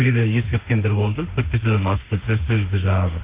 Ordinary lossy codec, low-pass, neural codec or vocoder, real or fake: Opus, 24 kbps; 3.6 kHz; autoencoder, 48 kHz, 32 numbers a frame, DAC-VAE, trained on Japanese speech; fake